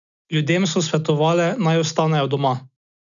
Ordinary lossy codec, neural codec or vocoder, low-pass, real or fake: none; none; 7.2 kHz; real